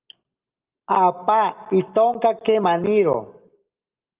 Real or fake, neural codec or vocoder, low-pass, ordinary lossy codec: real; none; 3.6 kHz; Opus, 32 kbps